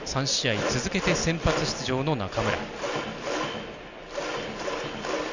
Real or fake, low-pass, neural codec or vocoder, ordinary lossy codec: real; 7.2 kHz; none; none